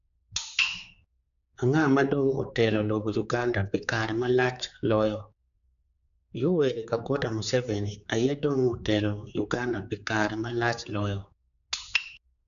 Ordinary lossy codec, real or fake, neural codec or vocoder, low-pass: none; fake; codec, 16 kHz, 4 kbps, X-Codec, HuBERT features, trained on general audio; 7.2 kHz